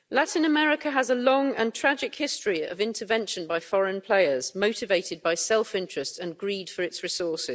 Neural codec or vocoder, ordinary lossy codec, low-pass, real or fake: none; none; none; real